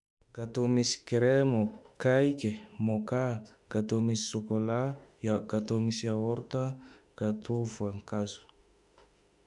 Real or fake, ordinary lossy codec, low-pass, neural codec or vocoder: fake; none; 10.8 kHz; autoencoder, 48 kHz, 32 numbers a frame, DAC-VAE, trained on Japanese speech